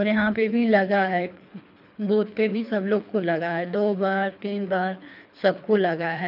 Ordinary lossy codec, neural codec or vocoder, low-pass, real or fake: none; codec, 24 kHz, 3 kbps, HILCodec; 5.4 kHz; fake